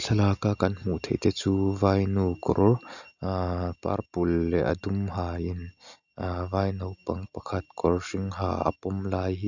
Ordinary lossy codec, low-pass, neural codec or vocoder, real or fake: none; 7.2 kHz; none; real